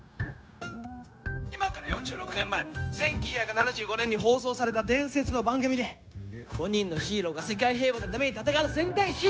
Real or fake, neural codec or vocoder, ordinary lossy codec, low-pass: fake; codec, 16 kHz, 0.9 kbps, LongCat-Audio-Codec; none; none